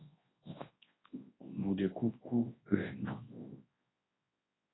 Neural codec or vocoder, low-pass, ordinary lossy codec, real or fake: codec, 24 kHz, 0.9 kbps, WavTokenizer, large speech release; 7.2 kHz; AAC, 16 kbps; fake